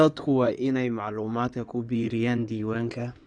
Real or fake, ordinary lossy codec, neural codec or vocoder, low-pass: fake; none; codec, 16 kHz in and 24 kHz out, 2.2 kbps, FireRedTTS-2 codec; 9.9 kHz